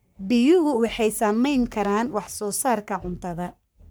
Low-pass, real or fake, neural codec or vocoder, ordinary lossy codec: none; fake; codec, 44.1 kHz, 3.4 kbps, Pupu-Codec; none